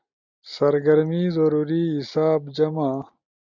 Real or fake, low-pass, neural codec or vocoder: real; 7.2 kHz; none